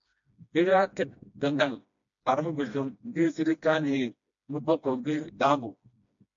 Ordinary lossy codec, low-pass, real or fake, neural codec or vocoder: MP3, 64 kbps; 7.2 kHz; fake; codec, 16 kHz, 1 kbps, FreqCodec, smaller model